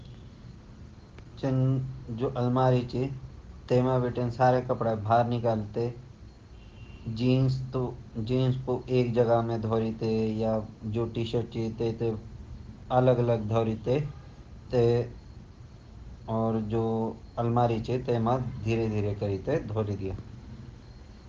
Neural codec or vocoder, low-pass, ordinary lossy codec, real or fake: none; 7.2 kHz; Opus, 16 kbps; real